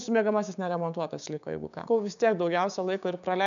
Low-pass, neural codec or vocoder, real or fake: 7.2 kHz; codec, 16 kHz, 6 kbps, DAC; fake